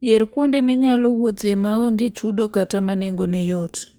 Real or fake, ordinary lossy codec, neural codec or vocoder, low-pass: fake; none; codec, 44.1 kHz, 2.6 kbps, DAC; none